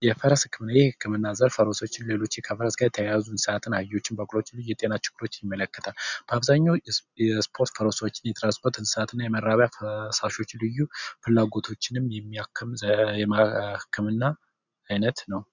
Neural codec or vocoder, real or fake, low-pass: none; real; 7.2 kHz